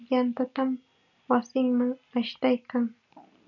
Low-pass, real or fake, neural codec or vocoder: 7.2 kHz; real; none